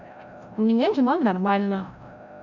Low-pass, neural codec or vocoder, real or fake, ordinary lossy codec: 7.2 kHz; codec, 16 kHz, 0.5 kbps, FreqCodec, larger model; fake; none